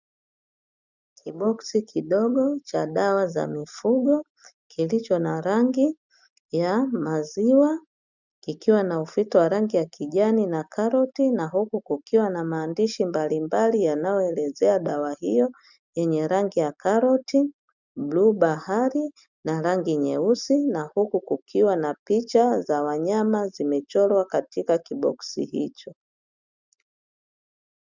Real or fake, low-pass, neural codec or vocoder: real; 7.2 kHz; none